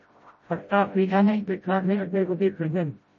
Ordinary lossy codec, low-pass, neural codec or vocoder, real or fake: MP3, 32 kbps; 7.2 kHz; codec, 16 kHz, 0.5 kbps, FreqCodec, smaller model; fake